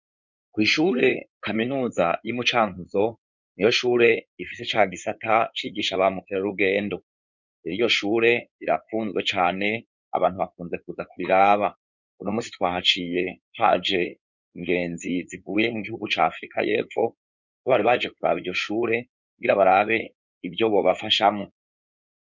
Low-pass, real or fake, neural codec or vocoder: 7.2 kHz; fake; codec, 16 kHz in and 24 kHz out, 2.2 kbps, FireRedTTS-2 codec